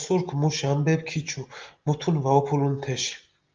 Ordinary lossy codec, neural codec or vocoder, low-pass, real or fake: Opus, 24 kbps; none; 7.2 kHz; real